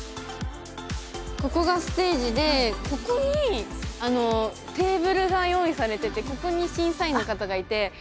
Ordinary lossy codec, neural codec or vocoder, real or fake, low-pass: none; none; real; none